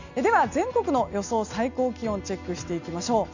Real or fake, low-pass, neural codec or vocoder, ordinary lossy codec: real; 7.2 kHz; none; none